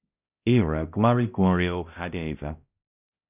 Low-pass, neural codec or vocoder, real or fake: 3.6 kHz; codec, 16 kHz, 0.5 kbps, X-Codec, HuBERT features, trained on balanced general audio; fake